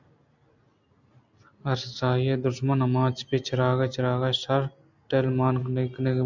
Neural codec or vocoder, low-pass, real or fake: none; 7.2 kHz; real